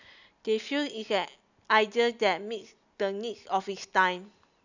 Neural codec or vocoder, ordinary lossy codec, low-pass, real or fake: none; none; 7.2 kHz; real